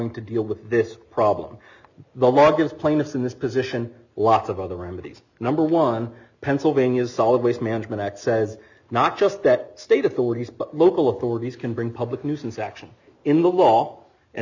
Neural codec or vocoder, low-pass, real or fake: none; 7.2 kHz; real